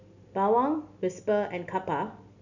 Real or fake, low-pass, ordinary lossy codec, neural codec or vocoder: real; 7.2 kHz; none; none